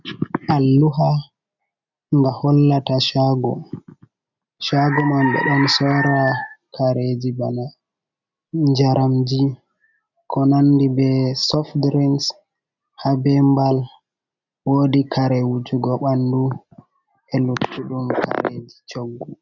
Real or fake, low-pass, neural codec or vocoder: real; 7.2 kHz; none